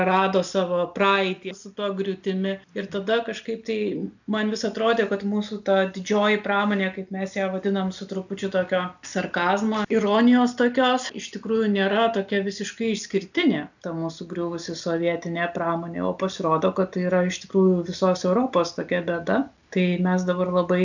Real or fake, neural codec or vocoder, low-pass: real; none; 7.2 kHz